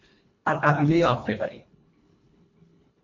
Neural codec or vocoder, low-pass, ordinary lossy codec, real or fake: codec, 24 kHz, 1.5 kbps, HILCodec; 7.2 kHz; MP3, 48 kbps; fake